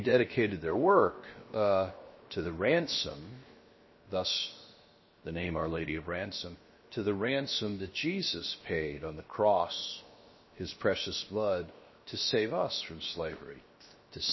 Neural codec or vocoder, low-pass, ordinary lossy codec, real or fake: codec, 16 kHz, 0.7 kbps, FocalCodec; 7.2 kHz; MP3, 24 kbps; fake